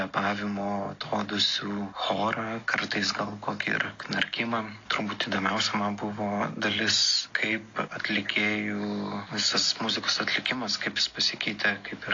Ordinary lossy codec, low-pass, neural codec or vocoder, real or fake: AAC, 32 kbps; 7.2 kHz; none; real